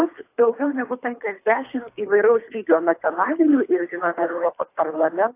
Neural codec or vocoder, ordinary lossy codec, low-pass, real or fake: codec, 24 kHz, 3 kbps, HILCodec; AAC, 24 kbps; 3.6 kHz; fake